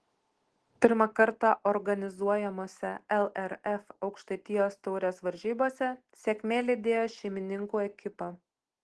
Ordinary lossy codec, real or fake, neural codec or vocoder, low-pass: Opus, 16 kbps; real; none; 10.8 kHz